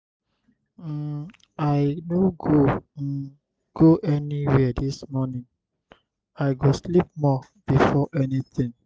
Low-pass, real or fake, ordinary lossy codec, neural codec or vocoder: none; real; none; none